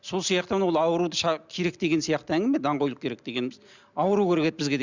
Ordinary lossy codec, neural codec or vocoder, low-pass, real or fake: Opus, 64 kbps; none; 7.2 kHz; real